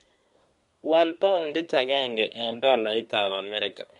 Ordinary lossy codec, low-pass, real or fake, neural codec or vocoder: MP3, 64 kbps; 10.8 kHz; fake; codec, 24 kHz, 1 kbps, SNAC